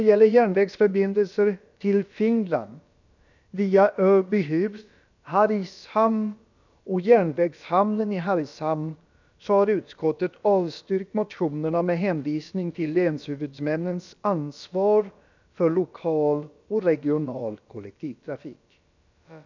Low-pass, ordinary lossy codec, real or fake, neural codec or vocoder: 7.2 kHz; none; fake; codec, 16 kHz, about 1 kbps, DyCAST, with the encoder's durations